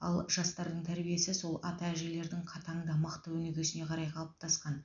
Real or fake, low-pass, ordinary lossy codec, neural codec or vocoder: real; 7.2 kHz; none; none